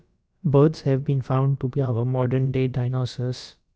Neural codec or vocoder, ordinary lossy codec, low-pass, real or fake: codec, 16 kHz, about 1 kbps, DyCAST, with the encoder's durations; none; none; fake